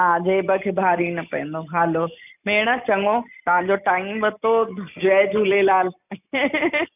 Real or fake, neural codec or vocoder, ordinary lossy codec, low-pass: real; none; none; 3.6 kHz